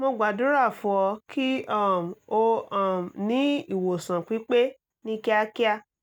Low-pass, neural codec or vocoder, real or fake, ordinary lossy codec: 19.8 kHz; none; real; none